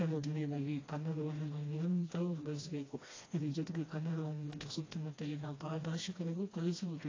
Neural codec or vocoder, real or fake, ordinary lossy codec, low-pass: codec, 16 kHz, 1 kbps, FreqCodec, smaller model; fake; AAC, 32 kbps; 7.2 kHz